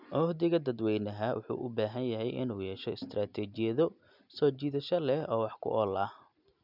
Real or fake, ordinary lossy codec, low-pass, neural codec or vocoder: real; none; 5.4 kHz; none